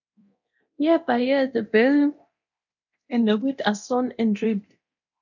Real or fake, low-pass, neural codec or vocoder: fake; 7.2 kHz; codec, 24 kHz, 0.9 kbps, DualCodec